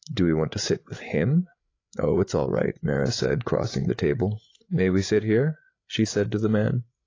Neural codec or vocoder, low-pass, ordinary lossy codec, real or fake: codec, 16 kHz, 8 kbps, FreqCodec, larger model; 7.2 kHz; AAC, 32 kbps; fake